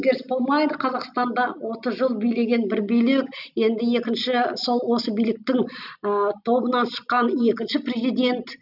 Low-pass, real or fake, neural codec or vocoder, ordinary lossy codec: 5.4 kHz; real; none; none